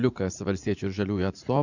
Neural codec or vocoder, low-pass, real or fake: vocoder, 44.1 kHz, 80 mel bands, Vocos; 7.2 kHz; fake